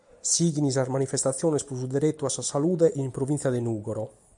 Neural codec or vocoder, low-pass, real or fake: none; 10.8 kHz; real